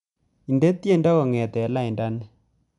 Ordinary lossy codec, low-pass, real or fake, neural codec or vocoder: none; 10.8 kHz; real; none